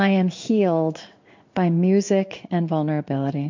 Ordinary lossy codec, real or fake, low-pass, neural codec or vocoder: MP3, 48 kbps; fake; 7.2 kHz; codec, 16 kHz in and 24 kHz out, 1 kbps, XY-Tokenizer